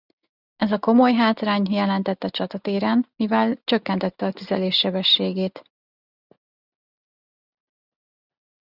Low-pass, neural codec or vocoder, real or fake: 5.4 kHz; none; real